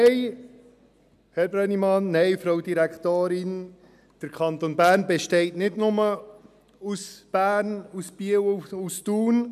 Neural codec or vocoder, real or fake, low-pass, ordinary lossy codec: none; real; 14.4 kHz; none